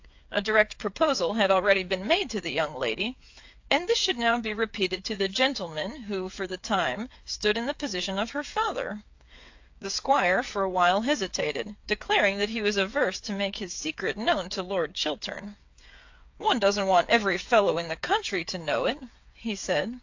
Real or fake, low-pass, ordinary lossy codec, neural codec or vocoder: fake; 7.2 kHz; AAC, 48 kbps; codec, 16 kHz, 8 kbps, FreqCodec, smaller model